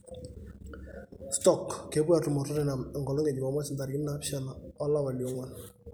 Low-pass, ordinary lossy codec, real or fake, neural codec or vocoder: none; none; fake; vocoder, 44.1 kHz, 128 mel bands every 256 samples, BigVGAN v2